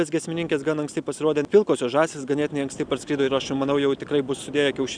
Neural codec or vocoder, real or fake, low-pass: none; real; 9.9 kHz